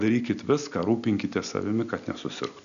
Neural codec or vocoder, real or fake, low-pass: none; real; 7.2 kHz